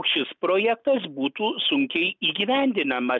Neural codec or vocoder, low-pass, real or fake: none; 7.2 kHz; real